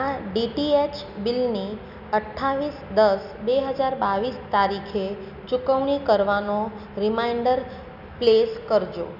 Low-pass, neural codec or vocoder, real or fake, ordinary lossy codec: 5.4 kHz; none; real; none